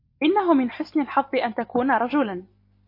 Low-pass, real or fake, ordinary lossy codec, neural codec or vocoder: 5.4 kHz; real; MP3, 32 kbps; none